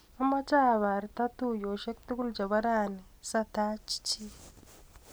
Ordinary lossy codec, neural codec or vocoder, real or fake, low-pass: none; none; real; none